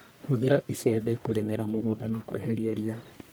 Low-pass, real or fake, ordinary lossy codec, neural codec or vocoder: none; fake; none; codec, 44.1 kHz, 1.7 kbps, Pupu-Codec